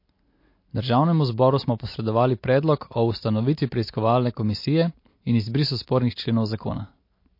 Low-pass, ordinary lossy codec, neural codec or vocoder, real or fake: 5.4 kHz; MP3, 32 kbps; none; real